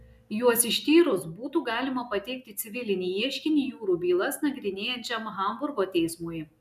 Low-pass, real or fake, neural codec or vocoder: 14.4 kHz; real; none